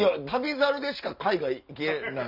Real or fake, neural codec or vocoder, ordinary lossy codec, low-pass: real; none; none; 5.4 kHz